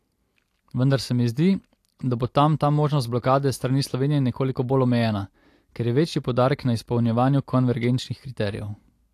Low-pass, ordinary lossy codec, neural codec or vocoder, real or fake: 14.4 kHz; AAC, 64 kbps; none; real